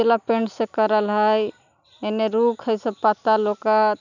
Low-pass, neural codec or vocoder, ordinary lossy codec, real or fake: 7.2 kHz; none; none; real